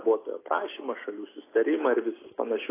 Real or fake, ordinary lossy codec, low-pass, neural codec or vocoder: real; AAC, 16 kbps; 3.6 kHz; none